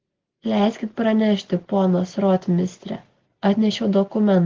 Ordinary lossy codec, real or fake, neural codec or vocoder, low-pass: Opus, 16 kbps; real; none; 7.2 kHz